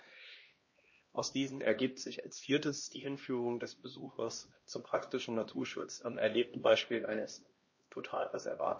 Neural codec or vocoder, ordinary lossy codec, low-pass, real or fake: codec, 16 kHz, 1 kbps, X-Codec, HuBERT features, trained on LibriSpeech; MP3, 32 kbps; 7.2 kHz; fake